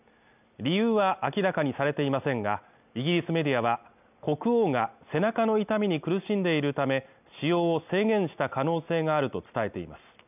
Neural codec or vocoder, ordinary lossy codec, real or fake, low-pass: none; none; real; 3.6 kHz